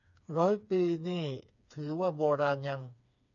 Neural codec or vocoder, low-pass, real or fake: codec, 16 kHz, 4 kbps, FreqCodec, smaller model; 7.2 kHz; fake